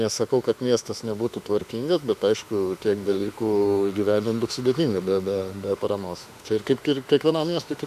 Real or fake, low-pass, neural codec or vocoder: fake; 14.4 kHz; autoencoder, 48 kHz, 32 numbers a frame, DAC-VAE, trained on Japanese speech